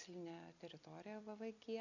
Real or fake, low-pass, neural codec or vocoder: real; 7.2 kHz; none